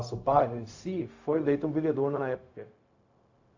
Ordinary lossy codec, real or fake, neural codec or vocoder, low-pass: AAC, 48 kbps; fake; codec, 16 kHz, 0.4 kbps, LongCat-Audio-Codec; 7.2 kHz